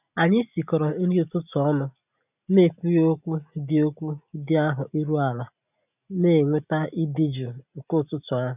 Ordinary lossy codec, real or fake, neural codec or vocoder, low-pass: none; real; none; 3.6 kHz